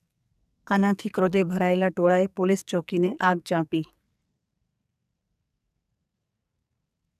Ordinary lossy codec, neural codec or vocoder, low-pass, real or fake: none; codec, 44.1 kHz, 2.6 kbps, SNAC; 14.4 kHz; fake